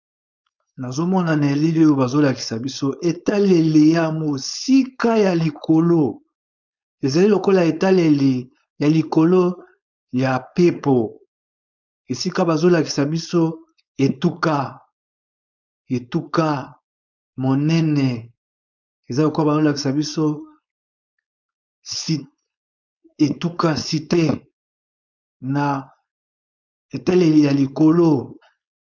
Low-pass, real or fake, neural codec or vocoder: 7.2 kHz; fake; codec, 16 kHz, 4.8 kbps, FACodec